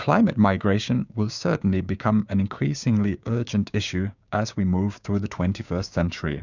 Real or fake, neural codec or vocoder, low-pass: fake; codec, 16 kHz, 6 kbps, DAC; 7.2 kHz